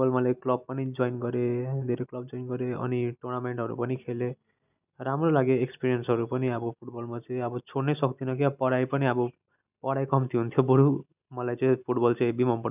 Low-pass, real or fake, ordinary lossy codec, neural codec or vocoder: 3.6 kHz; real; none; none